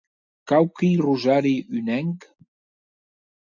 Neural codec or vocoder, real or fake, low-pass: none; real; 7.2 kHz